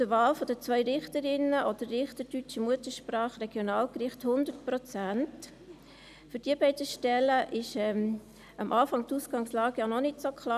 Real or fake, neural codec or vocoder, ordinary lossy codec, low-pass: real; none; none; 14.4 kHz